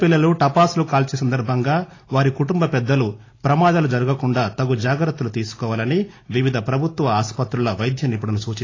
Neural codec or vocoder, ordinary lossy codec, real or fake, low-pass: none; AAC, 32 kbps; real; 7.2 kHz